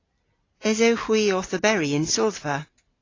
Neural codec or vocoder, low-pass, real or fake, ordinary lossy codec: none; 7.2 kHz; real; AAC, 32 kbps